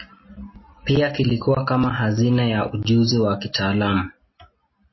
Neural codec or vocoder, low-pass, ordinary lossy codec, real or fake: none; 7.2 kHz; MP3, 24 kbps; real